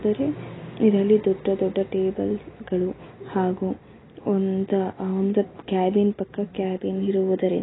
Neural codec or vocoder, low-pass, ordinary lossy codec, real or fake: none; 7.2 kHz; AAC, 16 kbps; real